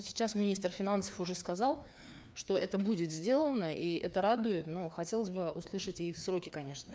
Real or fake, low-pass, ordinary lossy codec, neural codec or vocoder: fake; none; none; codec, 16 kHz, 2 kbps, FreqCodec, larger model